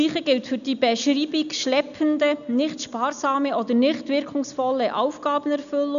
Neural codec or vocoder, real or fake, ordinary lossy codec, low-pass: none; real; none; 7.2 kHz